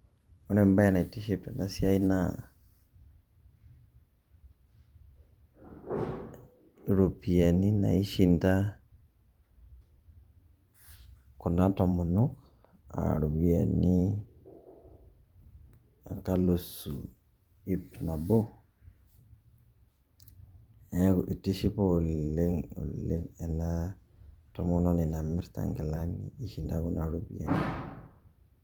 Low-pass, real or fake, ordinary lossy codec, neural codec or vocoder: 19.8 kHz; fake; Opus, 32 kbps; vocoder, 44.1 kHz, 128 mel bands every 512 samples, BigVGAN v2